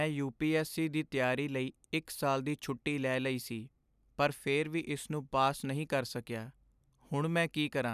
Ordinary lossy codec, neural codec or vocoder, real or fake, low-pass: none; none; real; 14.4 kHz